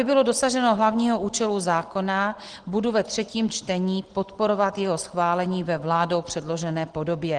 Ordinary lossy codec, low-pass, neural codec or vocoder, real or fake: Opus, 16 kbps; 9.9 kHz; none; real